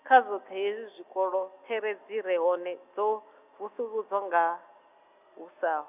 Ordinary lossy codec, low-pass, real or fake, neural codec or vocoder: none; 3.6 kHz; real; none